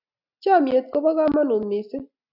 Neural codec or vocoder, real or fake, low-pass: none; real; 5.4 kHz